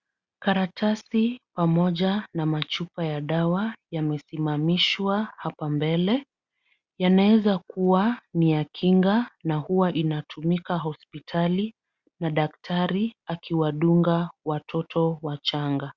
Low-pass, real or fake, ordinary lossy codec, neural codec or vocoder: 7.2 kHz; real; Opus, 64 kbps; none